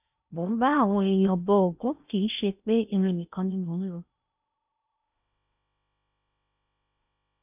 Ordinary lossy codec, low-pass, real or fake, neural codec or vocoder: none; 3.6 kHz; fake; codec, 16 kHz in and 24 kHz out, 0.8 kbps, FocalCodec, streaming, 65536 codes